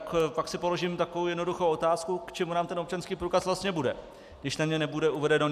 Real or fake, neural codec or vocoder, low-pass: real; none; 14.4 kHz